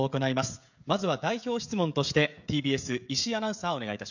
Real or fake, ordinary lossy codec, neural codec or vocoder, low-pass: fake; none; codec, 16 kHz, 16 kbps, FreqCodec, smaller model; 7.2 kHz